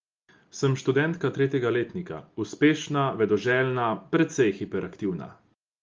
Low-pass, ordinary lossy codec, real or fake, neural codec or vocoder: 7.2 kHz; Opus, 24 kbps; real; none